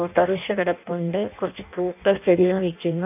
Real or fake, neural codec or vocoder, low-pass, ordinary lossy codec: fake; codec, 16 kHz in and 24 kHz out, 0.6 kbps, FireRedTTS-2 codec; 3.6 kHz; none